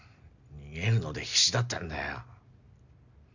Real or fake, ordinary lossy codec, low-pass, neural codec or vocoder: real; none; 7.2 kHz; none